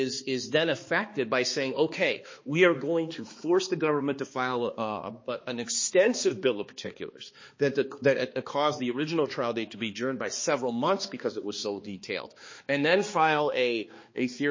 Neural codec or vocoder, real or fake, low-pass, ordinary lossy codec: codec, 16 kHz, 2 kbps, X-Codec, HuBERT features, trained on balanced general audio; fake; 7.2 kHz; MP3, 32 kbps